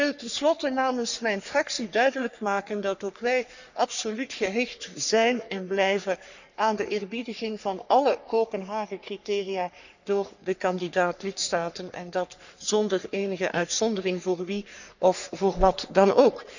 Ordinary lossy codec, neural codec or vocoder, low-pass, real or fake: none; codec, 44.1 kHz, 3.4 kbps, Pupu-Codec; 7.2 kHz; fake